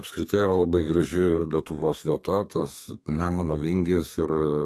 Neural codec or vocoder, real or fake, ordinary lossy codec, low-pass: codec, 32 kHz, 1.9 kbps, SNAC; fake; AAC, 64 kbps; 14.4 kHz